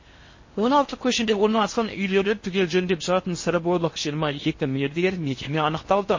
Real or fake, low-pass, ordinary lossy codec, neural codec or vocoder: fake; 7.2 kHz; MP3, 32 kbps; codec, 16 kHz in and 24 kHz out, 0.6 kbps, FocalCodec, streaming, 4096 codes